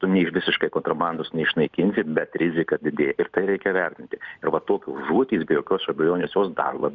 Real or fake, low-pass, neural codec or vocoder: real; 7.2 kHz; none